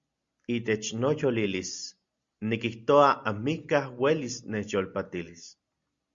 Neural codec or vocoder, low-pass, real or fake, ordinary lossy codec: none; 7.2 kHz; real; Opus, 64 kbps